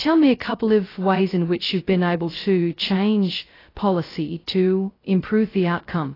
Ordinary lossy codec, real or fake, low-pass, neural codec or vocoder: AAC, 24 kbps; fake; 5.4 kHz; codec, 16 kHz, 0.2 kbps, FocalCodec